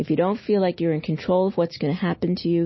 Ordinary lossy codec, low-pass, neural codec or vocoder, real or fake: MP3, 24 kbps; 7.2 kHz; none; real